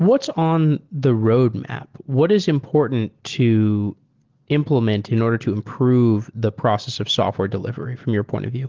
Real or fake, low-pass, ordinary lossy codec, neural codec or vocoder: real; 7.2 kHz; Opus, 16 kbps; none